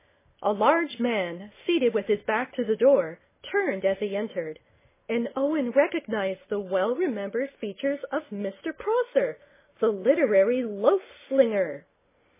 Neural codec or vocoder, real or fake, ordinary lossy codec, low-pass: none; real; MP3, 16 kbps; 3.6 kHz